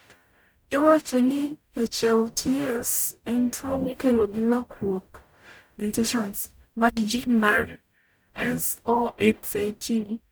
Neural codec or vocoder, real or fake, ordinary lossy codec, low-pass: codec, 44.1 kHz, 0.9 kbps, DAC; fake; none; none